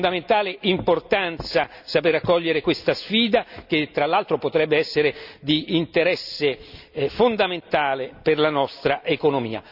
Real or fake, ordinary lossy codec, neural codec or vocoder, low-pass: real; none; none; 5.4 kHz